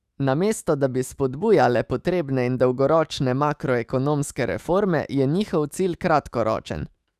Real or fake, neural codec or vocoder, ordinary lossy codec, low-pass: fake; autoencoder, 48 kHz, 128 numbers a frame, DAC-VAE, trained on Japanese speech; Opus, 64 kbps; 14.4 kHz